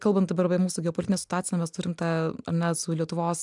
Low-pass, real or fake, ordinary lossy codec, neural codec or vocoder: 10.8 kHz; real; MP3, 96 kbps; none